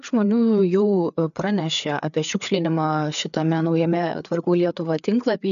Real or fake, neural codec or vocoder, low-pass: fake; codec, 16 kHz, 4 kbps, FreqCodec, larger model; 7.2 kHz